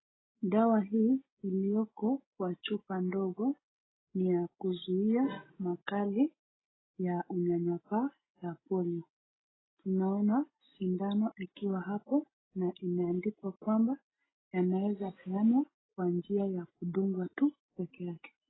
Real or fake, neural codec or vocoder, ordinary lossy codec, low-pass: real; none; AAC, 16 kbps; 7.2 kHz